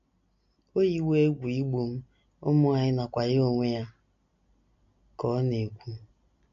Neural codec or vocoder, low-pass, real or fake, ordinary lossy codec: none; 7.2 kHz; real; MP3, 48 kbps